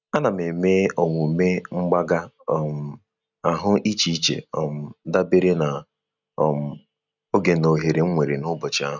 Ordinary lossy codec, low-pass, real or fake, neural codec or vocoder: none; 7.2 kHz; real; none